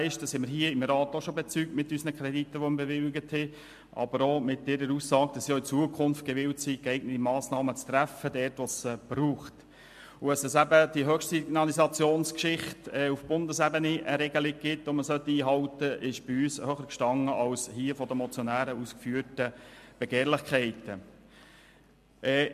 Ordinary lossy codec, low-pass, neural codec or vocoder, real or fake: MP3, 64 kbps; 14.4 kHz; none; real